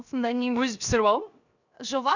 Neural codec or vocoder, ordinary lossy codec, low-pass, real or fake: codec, 16 kHz, 0.7 kbps, FocalCodec; none; 7.2 kHz; fake